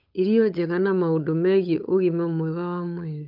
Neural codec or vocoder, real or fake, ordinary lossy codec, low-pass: codec, 16 kHz, 8 kbps, FunCodec, trained on Chinese and English, 25 frames a second; fake; none; 5.4 kHz